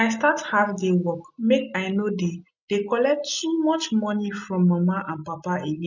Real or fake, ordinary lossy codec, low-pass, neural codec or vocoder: real; none; 7.2 kHz; none